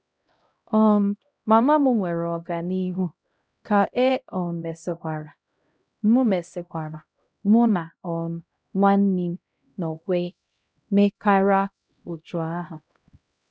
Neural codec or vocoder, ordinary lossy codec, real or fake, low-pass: codec, 16 kHz, 0.5 kbps, X-Codec, HuBERT features, trained on LibriSpeech; none; fake; none